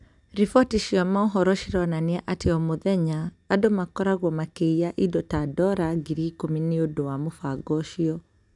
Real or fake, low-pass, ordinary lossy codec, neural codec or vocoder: real; 10.8 kHz; none; none